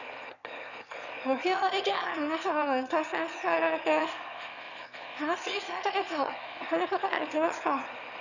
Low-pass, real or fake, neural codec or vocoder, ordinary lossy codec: 7.2 kHz; fake; autoencoder, 22.05 kHz, a latent of 192 numbers a frame, VITS, trained on one speaker; none